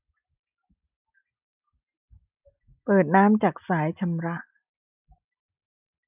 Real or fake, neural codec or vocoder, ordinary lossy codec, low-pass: real; none; none; 3.6 kHz